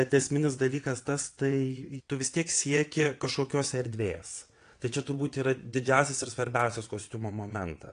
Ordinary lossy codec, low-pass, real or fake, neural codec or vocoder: AAC, 48 kbps; 9.9 kHz; fake; vocoder, 22.05 kHz, 80 mel bands, WaveNeXt